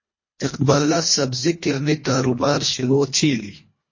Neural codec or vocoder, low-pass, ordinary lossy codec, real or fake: codec, 24 kHz, 1.5 kbps, HILCodec; 7.2 kHz; MP3, 32 kbps; fake